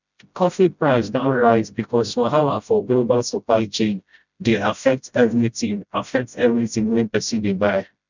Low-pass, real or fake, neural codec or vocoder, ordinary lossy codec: 7.2 kHz; fake; codec, 16 kHz, 0.5 kbps, FreqCodec, smaller model; none